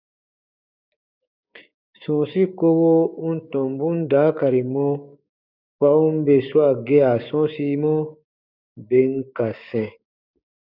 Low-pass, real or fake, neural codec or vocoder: 5.4 kHz; fake; codec, 16 kHz, 6 kbps, DAC